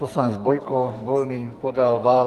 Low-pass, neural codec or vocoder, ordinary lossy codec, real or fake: 14.4 kHz; codec, 44.1 kHz, 2.6 kbps, SNAC; Opus, 32 kbps; fake